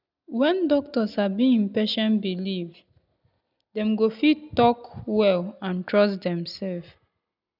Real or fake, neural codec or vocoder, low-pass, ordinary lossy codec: real; none; 5.4 kHz; none